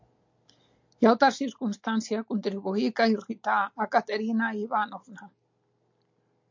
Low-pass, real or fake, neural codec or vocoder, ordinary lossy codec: 7.2 kHz; real; none; MP3, 48 kbps